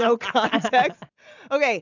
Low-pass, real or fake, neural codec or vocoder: 7.2 kHz; real; none